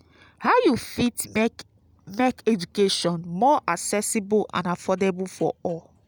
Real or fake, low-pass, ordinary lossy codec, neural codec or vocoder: real; none; none; none